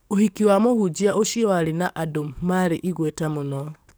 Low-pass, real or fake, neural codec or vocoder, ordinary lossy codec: none; fake; codec, 44.1 kHz, 7.8 kbps, DAC; none